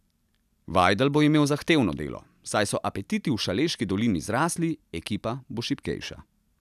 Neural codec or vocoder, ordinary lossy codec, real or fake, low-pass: none; none; real; 14.4 kHz